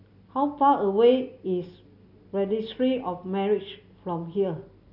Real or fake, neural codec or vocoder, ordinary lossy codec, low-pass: real; none; none; 5.4 kHz